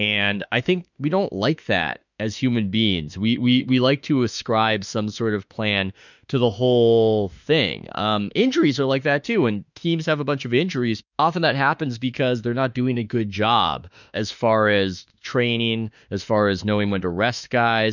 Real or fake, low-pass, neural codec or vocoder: fake; 7.2 kHz; autoencoder, 48 kHz, 32 numbers a frame, DAC-VAE, trained on Japanese speech